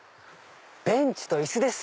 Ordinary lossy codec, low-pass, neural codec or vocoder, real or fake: none; none; none; real